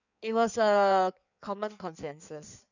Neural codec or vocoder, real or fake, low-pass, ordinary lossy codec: codec, 16 kHz in and 24 kHz out, 1.1 kbps, FireRedTTS-2 codec; fake; 7.2 kHz; none